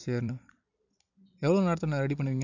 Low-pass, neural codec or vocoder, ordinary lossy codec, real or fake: 7.2 kHz; vocoder, 44.1 kHz, 128 mel bands every 256 samples, BigVGAN v2; none; fake